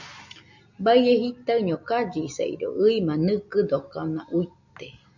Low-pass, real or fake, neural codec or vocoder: 7.2 kHz; real; none